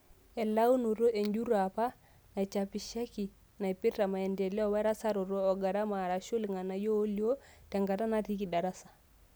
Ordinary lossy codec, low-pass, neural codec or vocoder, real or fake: none; none; none; real